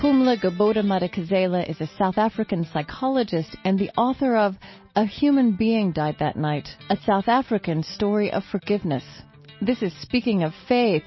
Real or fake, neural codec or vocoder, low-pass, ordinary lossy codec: real; none; 7.2 kHz; MP3, 24 kbps